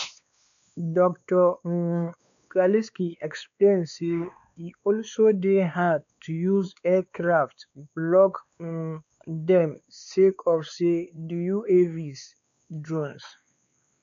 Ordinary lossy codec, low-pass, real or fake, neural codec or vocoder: none; 7.2 kHz; fake; codec, 16 kHz, 2 kbps, X-Codec, WavLM features, trained on Multilingual LibriSpeech